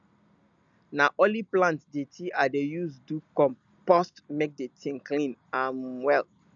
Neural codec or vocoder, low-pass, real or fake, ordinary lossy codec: none; 7.2 kHz; real; none